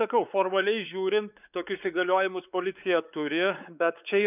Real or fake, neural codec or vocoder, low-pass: fake; codec, 16 kHz, 4 kbps, X-Codec, WavLM features, trained on Multilingual LibriSpeech; 3.6 kHz